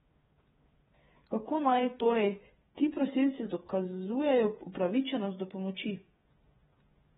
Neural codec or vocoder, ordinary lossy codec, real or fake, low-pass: codec, 16 kHz, 8 kbps, FreqCodec, smaller model; AAC, 16 kbps; fake; 7.2 kHz